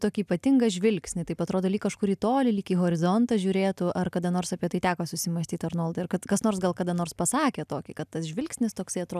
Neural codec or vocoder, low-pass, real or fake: none; 14.4 kHz; real